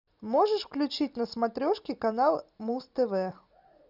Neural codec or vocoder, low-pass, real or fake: none; 5.4 kHz; real